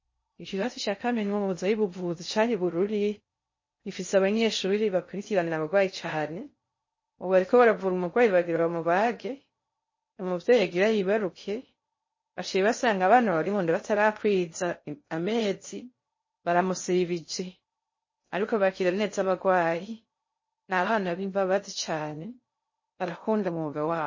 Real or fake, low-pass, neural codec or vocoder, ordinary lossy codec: fake; 7.2 kHz; codec, 16 kHz in and 24 kHz out, 0.6 kbps, FocalCodec, streaming, 2048 codes; MP3, 32 kbps